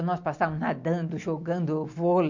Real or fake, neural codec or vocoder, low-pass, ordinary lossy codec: real; none; 7.2 kHz; MP3, 48 kbps